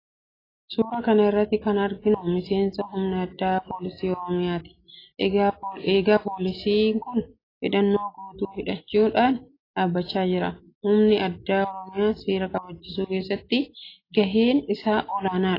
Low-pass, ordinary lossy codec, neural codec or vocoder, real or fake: 5.4 kHz; AAC, 24 kbps; none; real